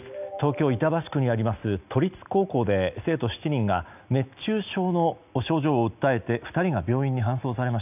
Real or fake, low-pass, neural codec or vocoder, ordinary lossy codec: real; 3.6 kHz; none; none